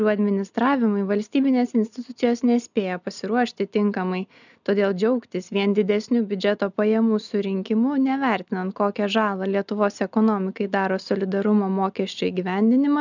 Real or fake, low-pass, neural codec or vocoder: real; 7.2 kHz; none